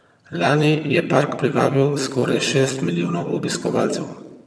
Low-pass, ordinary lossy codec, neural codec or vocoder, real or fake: none; none; vocoder, 22.05 kHz, 80 mel bands, HiFi-GAN; fake